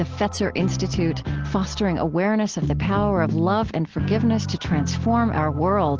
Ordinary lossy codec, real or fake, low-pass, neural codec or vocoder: Opus, 16 kbps; real; 7.2 kHz; none